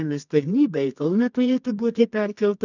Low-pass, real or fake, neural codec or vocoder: 7.2 kHz; fake; codec, 24 kHz, 0.9 kbps, WavTokenizer, medium music audio release